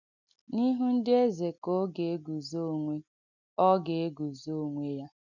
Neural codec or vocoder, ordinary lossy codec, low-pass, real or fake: none; none; 7.2 kHz; real